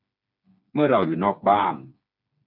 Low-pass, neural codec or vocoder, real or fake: 5.4 kHz; codec, 16 kHz, 4 kbps, FreqCodec, smaller model; fake